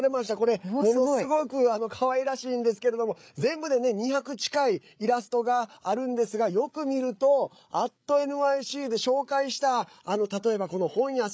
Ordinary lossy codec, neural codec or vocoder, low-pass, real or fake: none; codec, 16 kHz, 8 kbps, FreqCodec, larger model; none; fake